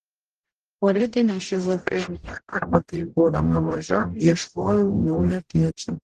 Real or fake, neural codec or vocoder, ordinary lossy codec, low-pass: fake; codec, 44.1 kHz, 0.9 kbps, DAC; Opus, 16 kbps; 14.4 kHz